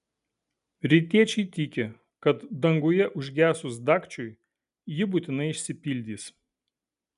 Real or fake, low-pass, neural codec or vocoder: real; 10.8 kHz; none